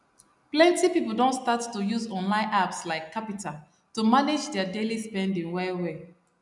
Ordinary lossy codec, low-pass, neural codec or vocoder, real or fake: none; 10.8 kHz; none; real